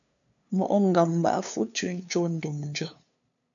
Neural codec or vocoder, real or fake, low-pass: codec, 16 kHz, 2 kbps, FunCodec, trained on LibriTTS, 25 frames a second; fake; 7.2 kHz